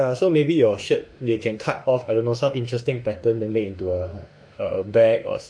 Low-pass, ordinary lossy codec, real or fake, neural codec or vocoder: 9.9 kHz; none; fake; autoencoder, 48 kHz, 32 numbers a frame, DAC-VAE, trained on Japanese speech